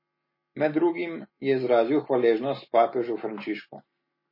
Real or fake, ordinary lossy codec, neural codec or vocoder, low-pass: real; MP3, 24 kbps; none; 5.4 kHz